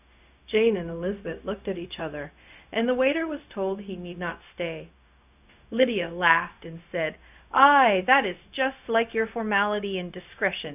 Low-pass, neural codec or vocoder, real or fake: 3.6 kHz; codec, 16 kHz, 0.4 kbps, LongCat-Audio-Codec; fake